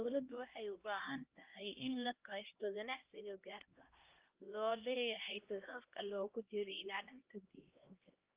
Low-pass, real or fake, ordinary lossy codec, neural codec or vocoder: 3.6 kHz; fake; Opus, 32 kbps; codec, 16 kHz, 1 kbps, X-Codec, HuBERT features, trained on LibriSpeech